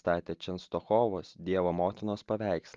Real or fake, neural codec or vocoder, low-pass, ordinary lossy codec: real; none; 7.2 kHz; Opus, 32 kbps